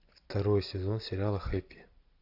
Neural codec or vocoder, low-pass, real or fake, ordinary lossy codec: none; 5.4 kHz; real; AAC, 48 kbps